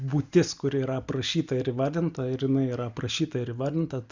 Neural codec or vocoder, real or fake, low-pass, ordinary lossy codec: none; real; 7.2 kHz; Opus, 64 kbps